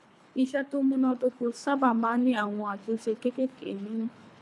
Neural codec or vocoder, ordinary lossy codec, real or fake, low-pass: codec, 24 kHz, 3 kbps, HILCodec; none; fake; none